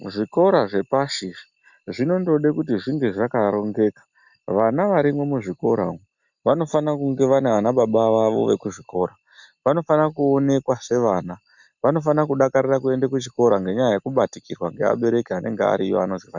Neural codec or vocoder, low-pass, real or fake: none; 7.2 kHz; real